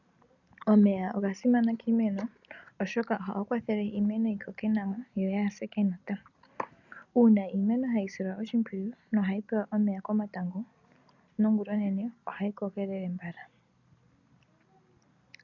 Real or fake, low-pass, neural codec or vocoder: real; 7.2 kHz; none